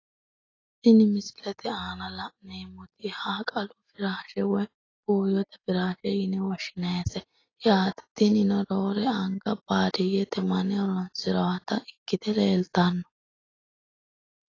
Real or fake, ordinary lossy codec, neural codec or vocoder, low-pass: real; AAC, 32 kbps; none; 7.2 kHz